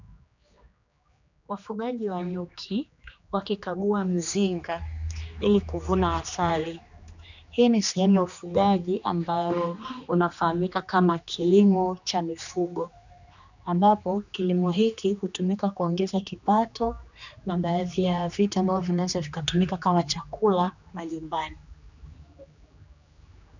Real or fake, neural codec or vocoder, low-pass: fake; codec, 16 kHz, 2 kbps, X-Codec, HuBERT features, trained on general audio; 7.2 kHz